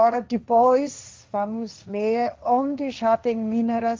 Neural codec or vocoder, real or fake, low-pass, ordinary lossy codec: codec, 16 kHz, 1.1 kbps, Voila-Tokenizer; fake; 7.2 kHz; Opus, 32 kbps